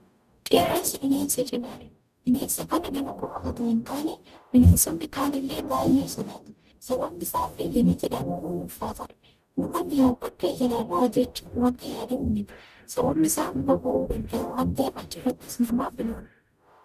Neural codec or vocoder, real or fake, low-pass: codec, 44.1 kHz, 0.9 kbps, DAC; fake; 14.4 kHz